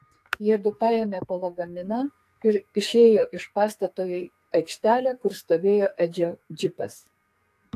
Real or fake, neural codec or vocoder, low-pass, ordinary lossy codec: fake; codec, 44.1 kHz, 2.6 kbps, SNAC; 14.4 kHz; AAC, 64 kbps